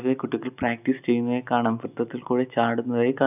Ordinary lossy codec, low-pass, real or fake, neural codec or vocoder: none; 3.6 kHz; real; none